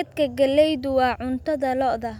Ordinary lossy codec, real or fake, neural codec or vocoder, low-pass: none; real; none; 19.8 kHz